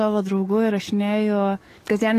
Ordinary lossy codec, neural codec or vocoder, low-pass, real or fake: AAC, 48 kbps; codec, 44.1 kHz, 7.8 kbps, DAC; 14.4 kHz; fake